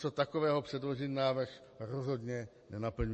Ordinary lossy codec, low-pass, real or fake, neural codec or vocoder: MP3, 32 kbps; 10.8 kHz; real; none